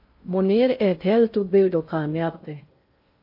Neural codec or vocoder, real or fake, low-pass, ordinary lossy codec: codec, 16 kHz in and 24 kHz out, 0.6 kbps, FocalCodec, streaming, 2048 codes; fake; 5.4 kHz; MP3, 32 kbps